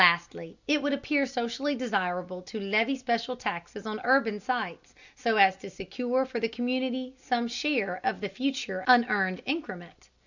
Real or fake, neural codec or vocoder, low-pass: real; none; 7.2 kHz